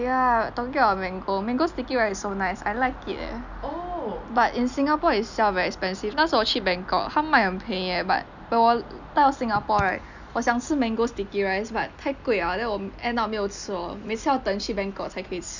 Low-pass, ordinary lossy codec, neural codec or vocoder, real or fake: 7.2 kHz; none; none; real